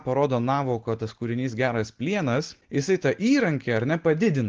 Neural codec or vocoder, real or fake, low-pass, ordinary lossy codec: none; real; 7.2 kHz; Opus, 16 kbps